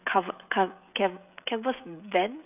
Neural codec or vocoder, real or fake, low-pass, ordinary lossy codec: codec, 16 kHz, 16 kbps, FreqCodec, smaller model; fake; 3.6 kHz; none